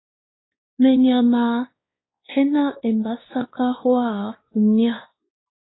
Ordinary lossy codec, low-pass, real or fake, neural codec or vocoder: AAC, 16 kbps; 7.2 kHz; fake; codec, 16 kHz, 2 kbps, X-Codec, WavLM features, trained on Multilingual LibriSpeech